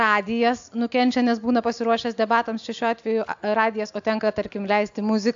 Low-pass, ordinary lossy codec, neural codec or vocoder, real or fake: 7.2 kHz; AAC, 64 kbps; none; real